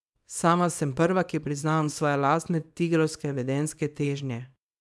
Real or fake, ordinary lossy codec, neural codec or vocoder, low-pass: fake; none; codec, 24 kHz, 0.9 kbps, WavTokenizer, small release; none